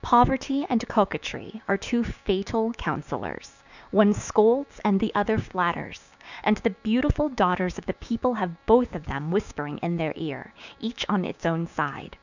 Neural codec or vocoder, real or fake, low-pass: codec, 16 kHz, 6 kbps, DAC; fake; 7.2 kHz